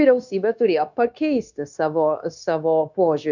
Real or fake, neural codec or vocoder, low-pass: fake; codec, 16 kHz, 0.9 kbps, LongCat-Audio-Codec; 7.2 kHz